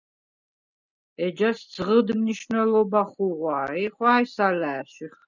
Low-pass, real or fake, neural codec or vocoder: 7.2 kHz; real; none